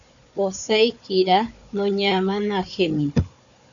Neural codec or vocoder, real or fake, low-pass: codec, 16 kHz, 4 kbps, FunCodec, trained on Chinese and English, 50 frames a second; fake; 7.2 kHz